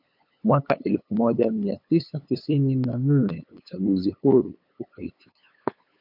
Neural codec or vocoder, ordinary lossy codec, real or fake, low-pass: codec, 16 kHz, 8 kbps, FunCodec, trained on LibriTTS, 25 frames a second; MP3, 48 kbps; fake; 5.4 kHz